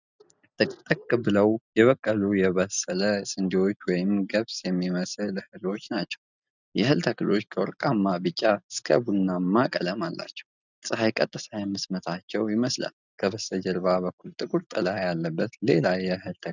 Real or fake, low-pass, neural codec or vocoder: real; 7.2 kHz; none